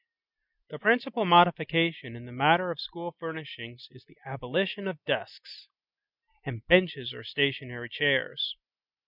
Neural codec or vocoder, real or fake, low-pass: none; real; 5.4 kHz